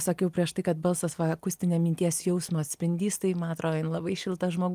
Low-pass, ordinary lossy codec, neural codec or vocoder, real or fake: 14.4 kHz; Opus, 32 kbps; none; real